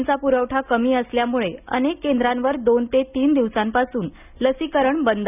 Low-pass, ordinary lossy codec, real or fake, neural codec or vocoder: 3.6 kHz; none; real; none